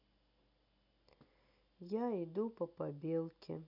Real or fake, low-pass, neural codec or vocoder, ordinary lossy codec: real; 5.4 kHz; none; none